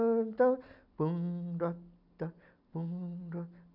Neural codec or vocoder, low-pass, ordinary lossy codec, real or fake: none; 5.4 kHz; none; real